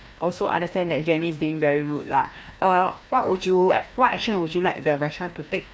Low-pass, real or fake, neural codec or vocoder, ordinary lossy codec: none; fake; codec, 16 kHz, 1 kbps, FreqCodec, larger model; none